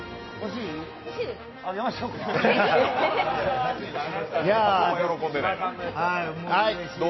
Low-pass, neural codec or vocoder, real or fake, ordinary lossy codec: 7.2 kHz; none; real; MP3, 24 kbps